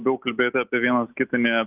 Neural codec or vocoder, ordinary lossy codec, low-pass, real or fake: none; Opus, 24 kbps; 3.6 kHz; real